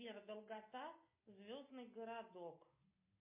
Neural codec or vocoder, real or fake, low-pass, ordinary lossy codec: none; real; 3.6 kHz; MP3, 24 kbps